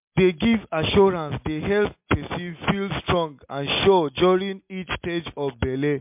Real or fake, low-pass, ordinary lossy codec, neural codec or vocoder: real; 3.6 kHz; MP3, 32 kbps; none